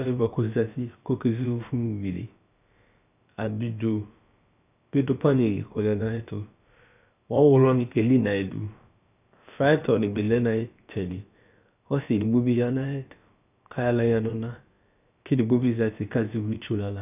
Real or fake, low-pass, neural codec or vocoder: fake; 3.6 kHz; codec, 16 kHz, about 1 kbps, DyCAST, with the encoder's durations